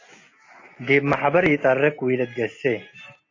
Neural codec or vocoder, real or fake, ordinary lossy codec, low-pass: none; real; AAC, 32 kbps; 7.2 kHz